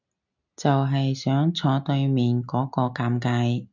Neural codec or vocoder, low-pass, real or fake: none; 7.2 kHz; real